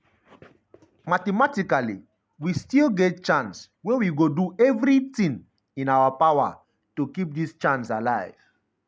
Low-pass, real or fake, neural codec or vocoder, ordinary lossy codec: none; real; none; none